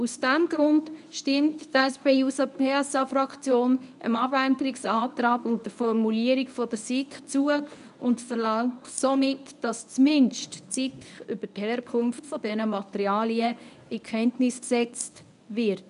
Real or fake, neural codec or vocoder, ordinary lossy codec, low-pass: fake; codec, 24 kHz, 0.9 kbps, WavTokenizer, medium speech release version 1; none; 10.8 kHz